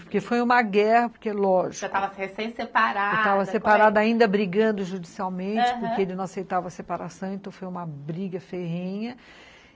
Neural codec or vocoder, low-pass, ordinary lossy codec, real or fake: none; none; none; real